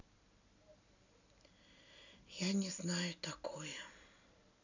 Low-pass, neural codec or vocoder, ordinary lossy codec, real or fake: 7.2 kHz; none; none; real